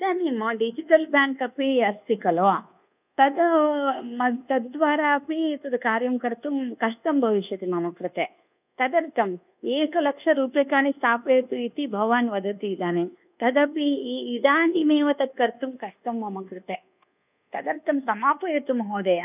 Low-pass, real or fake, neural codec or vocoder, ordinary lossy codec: 3.6 kHz; fake; codec, 24 kHz, 1.2 kbps, DualCodec; none